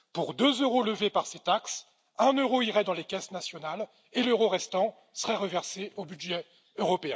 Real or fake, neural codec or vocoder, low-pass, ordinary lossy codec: real; none; none; none